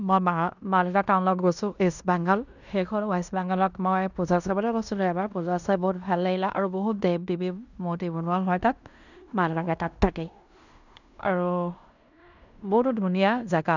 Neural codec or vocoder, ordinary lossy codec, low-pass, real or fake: codec, 16 kHz in and 24 kHz out, 0.9 kbps, LongCat-Audio-Codec, fine tuned four codebook decoder; none; 7.2 kHz; fake